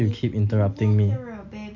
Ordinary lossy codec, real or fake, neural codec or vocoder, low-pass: none; real; none; 7.2 kHz